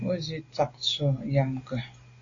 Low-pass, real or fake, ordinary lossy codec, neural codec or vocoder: 7.2 kHz; real; AAC, 32 kbps; none